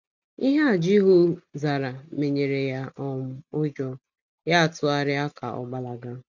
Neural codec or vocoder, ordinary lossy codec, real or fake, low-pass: none; none; real; 7.2 kHz